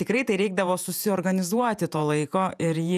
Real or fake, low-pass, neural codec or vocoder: fake; 14.4 kHz; vocoder, 48 kHz, 128 mel bands, Vocos